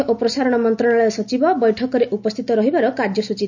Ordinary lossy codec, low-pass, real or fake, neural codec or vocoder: none; 7.2 kHz; real; none